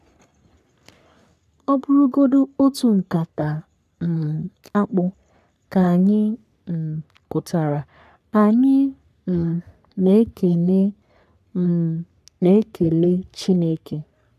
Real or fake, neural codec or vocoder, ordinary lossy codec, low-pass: fake; codec, 44.1 kHz, 3.4 kbps, Pupu-Codec; none; 14.4 kHz